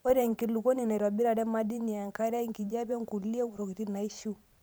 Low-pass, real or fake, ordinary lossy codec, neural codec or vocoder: none; fake; none; vocoder, 44.1 kHz, 128 mel bands every 512 samples, BigVGAN v2